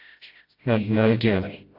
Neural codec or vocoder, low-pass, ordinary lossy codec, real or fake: codec, 16 kHz, 0.5 kbps, FreqCodec, smaller model; 5.4 kHz; AAC, 32 kbps; fake